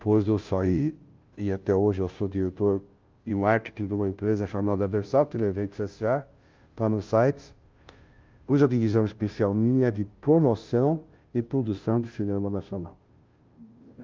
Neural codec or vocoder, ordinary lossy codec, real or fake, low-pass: codec, 16 kHz, 0.5 kbps, FunCodec, trained on Chinese and English, 25 frames a second; Opus, 32 kbps; fake; 7.2 kHz